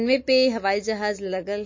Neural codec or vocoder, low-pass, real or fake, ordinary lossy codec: none; 7.2 kHz; real; MP3, 32 kbps